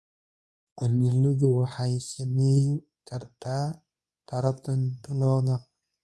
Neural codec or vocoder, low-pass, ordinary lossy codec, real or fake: codec, 24 kHz, 0.9 kbps, WavTokenizer, medium speech release version 2; none; none; fake